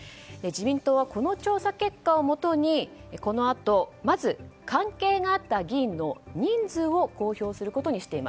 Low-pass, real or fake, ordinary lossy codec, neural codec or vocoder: none; real; none; none